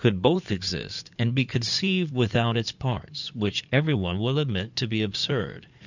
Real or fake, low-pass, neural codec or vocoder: fake; 7.2 kHz; codec, 16 kHz in and 24 kHz out, 2.2 kbps, FireRedTTS-2 codec